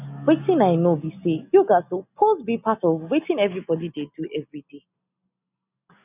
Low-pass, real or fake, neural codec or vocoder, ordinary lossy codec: 3.6 kHz; real; none; none